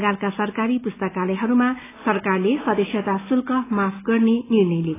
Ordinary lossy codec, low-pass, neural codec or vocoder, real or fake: AAC, 16 kbps; 3.6 kHz; none; real